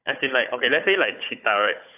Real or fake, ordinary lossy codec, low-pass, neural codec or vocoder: fake; AAC, 32 kbps; 3.6 kHz; codec, 16 kHz, 4 kbps, FunCodec, trained on Chinese and English, 50 frames a second